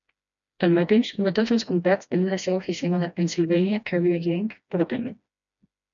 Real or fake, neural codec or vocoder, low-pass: fake; codec, 16 kHz, 1 kbps, FreqCodec, smaller model; 7.2 kHz